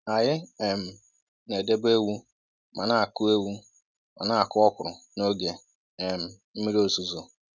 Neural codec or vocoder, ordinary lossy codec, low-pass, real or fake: none; none; none; real